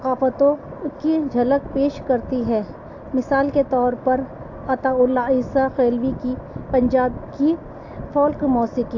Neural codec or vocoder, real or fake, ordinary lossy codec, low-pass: none; real; AAC, 48 kbps; 7.2 kHz